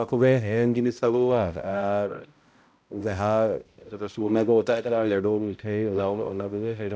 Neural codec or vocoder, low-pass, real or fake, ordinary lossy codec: codec, 16 kHz, 0.5 kbps, X-Codec, HuBERT features, trained on balanced general audio; none; fake; none